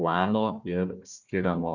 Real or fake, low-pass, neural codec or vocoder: fake; 7.2 kHz; codec, 16 kHz, 1 kbps, FunCodec, trained on Chinese and English, 50 frames a second